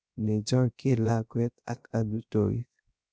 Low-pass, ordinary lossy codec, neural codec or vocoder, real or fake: none; none; codec, 16 kHz, 0.3 kbps, FocalCodec; fake